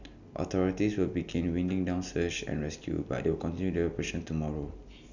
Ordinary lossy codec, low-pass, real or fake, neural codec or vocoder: none; 7.2 kHz; real; none